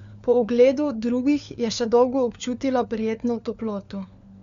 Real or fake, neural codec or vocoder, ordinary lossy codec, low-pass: fake; codec, 16 kHz, 4 kbps, FunCodec, trained on LibriTTS, 50 frames a second; Opus, 64 kbps; 7.2 kHz